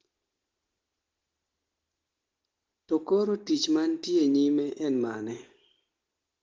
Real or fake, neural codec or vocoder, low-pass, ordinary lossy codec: real; none; 7.2 kHz; Opus, 16 kbps